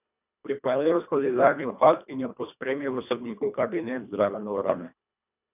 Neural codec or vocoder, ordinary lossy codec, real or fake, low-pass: codec, 24 kHz, 1.5 kbps, HILCodec; none; fake; 3.6 kHz